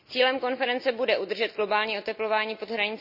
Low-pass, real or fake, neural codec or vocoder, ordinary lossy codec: 5.4 kHz; real; none; none